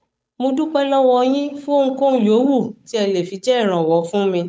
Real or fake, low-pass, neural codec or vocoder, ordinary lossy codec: fake; none; codec, 16 kHz, 8 kbps, FunCodec, trained on Chinese and English, 25 frames a second; none